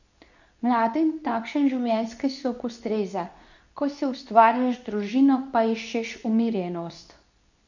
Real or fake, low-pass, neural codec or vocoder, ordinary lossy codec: fake; 7.2 kHz; codec, 24 kHz, 0.9 kbps, WavTokenizer, medium speech release version 2; none